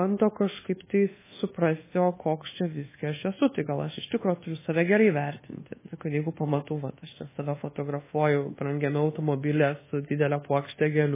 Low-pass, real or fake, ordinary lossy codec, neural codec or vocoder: 3.6 kHz; fake; MP3, 16 kbps; codec, 24 kHz, 1.2 kbps, DualCodec